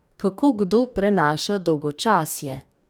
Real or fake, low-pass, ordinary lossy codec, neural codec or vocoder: fake; none; none; codec, 44.1 kHz, 2.6 kbps, DAC